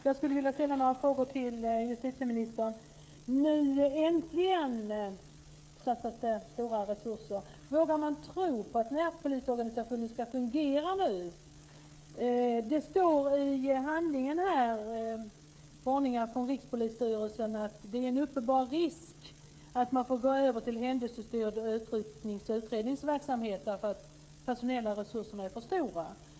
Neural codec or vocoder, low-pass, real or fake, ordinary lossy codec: codec, 16 kHz, 8 kbps, FreqCodec, smaller model; none; fake; none